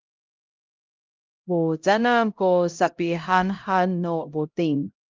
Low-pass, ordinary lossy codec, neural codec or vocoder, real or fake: 7.2 kHz; Opus, 32 kbps; codec, 16 kHz, 0.5 kbps, X-Codec, HuBERT features, trained on LibriSpeech; fake